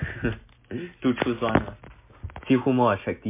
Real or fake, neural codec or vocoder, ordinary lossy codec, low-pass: real; none; MP3, 24 kbps; 3.6 kHz